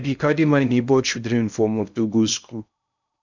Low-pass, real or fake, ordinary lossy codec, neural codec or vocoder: 7.2 kHz; fake; none; codec, 16 kHz in and 24 kHz out, 0.6 kbps, FocalCodec, streaming, 2048 codes